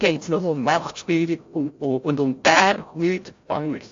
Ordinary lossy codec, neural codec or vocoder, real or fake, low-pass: AAC, 48 kbps; codec, 16 kHz, 0.5 kbps, FreqCodec, larger model; fake; 7.2 kHz